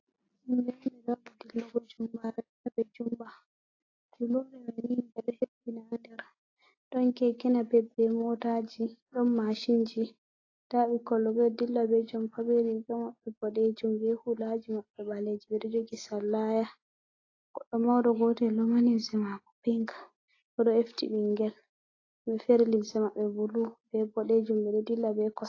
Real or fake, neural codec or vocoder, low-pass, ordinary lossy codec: real; none; 7.2 kHz; AAC, 32 kbps